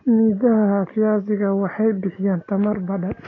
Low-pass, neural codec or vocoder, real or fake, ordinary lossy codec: 7.2 kHz; none; real; AAC, 32 kbps